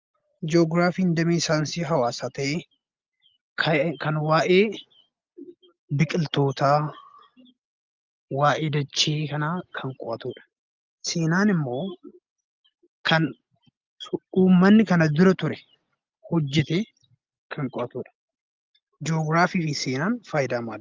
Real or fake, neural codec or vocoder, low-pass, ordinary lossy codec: real; none; 7.2 kHz; Opus, 24 kbps